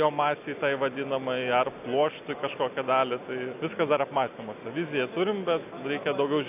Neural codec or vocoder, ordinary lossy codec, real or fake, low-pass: none; AAC, 32 kbps; real; 3.6 kHz